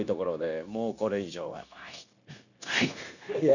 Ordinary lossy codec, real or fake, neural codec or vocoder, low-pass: none; fake; codec, 16 kHz, 0.9 kbps, LongCat-Audio-Codec; 7.2 kHz